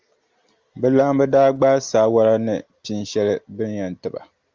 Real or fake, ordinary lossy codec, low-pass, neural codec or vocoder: real; Opus, 32 kbps; 7.2 kHz; none